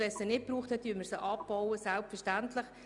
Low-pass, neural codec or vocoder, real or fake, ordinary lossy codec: 10.8 kHz; none; real; none